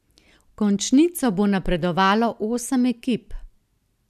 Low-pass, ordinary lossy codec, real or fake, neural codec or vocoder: 14.4 kHz; none; real; none